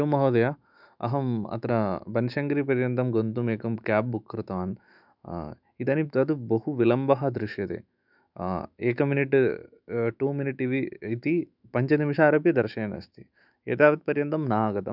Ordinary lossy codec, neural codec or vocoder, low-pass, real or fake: none; autoencoder, 48 kHz, 128 numbers a frame, DAC-VAE, trained on Japanese speech; 5.4 kHz; fake